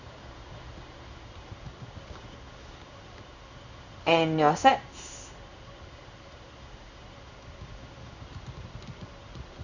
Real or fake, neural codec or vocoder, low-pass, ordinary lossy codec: fake; codec, 16 kHz in and 24 kHz out, 1 kbps, XY-Tokenizer; 7.2 kHz; none